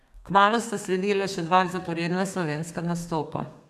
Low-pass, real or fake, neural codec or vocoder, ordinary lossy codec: 14.4 kHz; fake; codec, 32 kHz, 1.9 kbps, SNAC; none